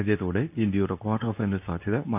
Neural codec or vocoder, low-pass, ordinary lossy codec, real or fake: codec, 24 kHz, 0.9 kbps, WavTokenizer, medium speech release version 2; 3.6 kHz; none; fake